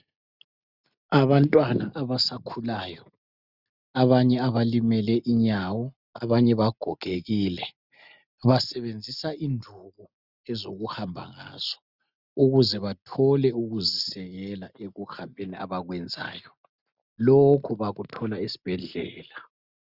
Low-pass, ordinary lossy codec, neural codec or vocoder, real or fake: 5.4 kHz; Opus, 64 kbps; none; real